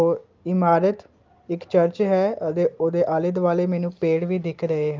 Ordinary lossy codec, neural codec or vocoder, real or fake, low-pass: Opus, 32 kbps; none; real; 7.2 kHz